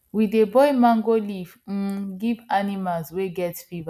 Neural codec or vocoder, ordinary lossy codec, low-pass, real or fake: none; none; 14.4 kHz; real